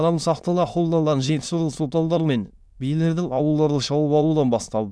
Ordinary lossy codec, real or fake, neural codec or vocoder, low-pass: none; fake; autoencoder, 22.05 kHz, a latent of 192 numbers a frame, VITS, trained on many speakers; none